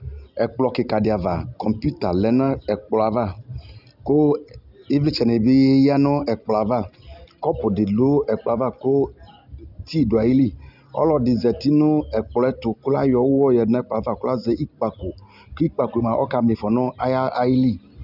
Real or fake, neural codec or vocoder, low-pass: real; none; 5.4 kHz